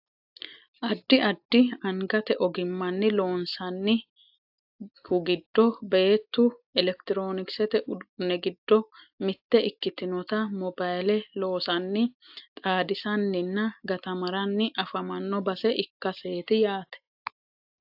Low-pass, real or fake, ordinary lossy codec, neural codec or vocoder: 5.4 kHz; real; AAC, 48 kbps; none